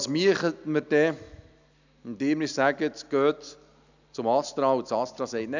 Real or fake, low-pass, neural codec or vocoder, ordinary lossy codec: real; 7.2 kHz; none; none